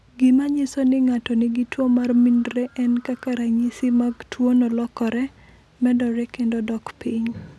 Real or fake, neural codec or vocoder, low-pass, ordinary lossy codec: real; none; none; none